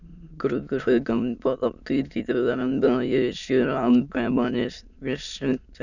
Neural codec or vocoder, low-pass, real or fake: autoencoder, 22.05 kHz, a latent of 192 numbers a frame, VITS, trained on many speakers; 7.2 kHz; fake